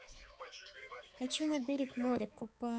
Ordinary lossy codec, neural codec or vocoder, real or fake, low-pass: none; codec, 16 kHz, 4 kbps, X-Codec, HuBERT features, trained on balanced general audio; fake; none